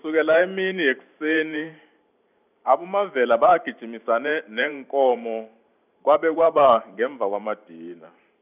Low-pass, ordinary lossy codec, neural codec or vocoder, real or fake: 3.6 kHz; none; vocoder, 44.1 kHz, 128 mel bands every 512 samples, BigVGAN v2; fake